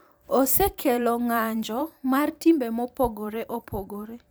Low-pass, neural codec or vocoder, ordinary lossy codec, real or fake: none; vocoder, 44.1 kHz, 128 mel bands every 512 samples, BigVGAN v2; none; fake